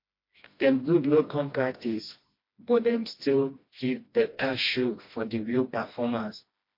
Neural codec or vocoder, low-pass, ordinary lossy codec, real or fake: codec, 16 kHz, 1 kbps, FreqCodec, smaller model; 5.4 kHz; MP3, 32 kbps; fake